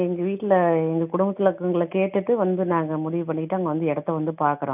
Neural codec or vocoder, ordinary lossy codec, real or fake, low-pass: none; none; real; 3.6 kHz